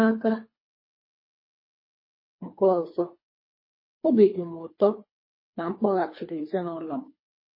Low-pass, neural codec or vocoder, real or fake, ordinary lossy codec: 5.4 kHz; codec, 24 kHz, 3 kbps, HILCodec; fake; MP3, 24 kbps